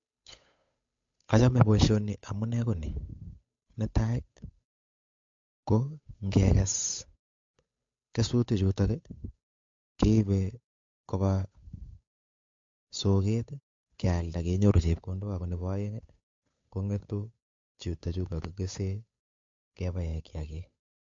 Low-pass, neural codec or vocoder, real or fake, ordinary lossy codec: 7.2 kHz; codec, 16 kHz, 8 kbps, FunCodec, trained on Chinese and English, 25 frames a second; fake; MP3, 48 kbps